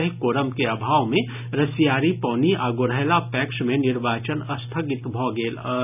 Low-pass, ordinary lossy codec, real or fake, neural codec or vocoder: 3.6 kHz; none; real; none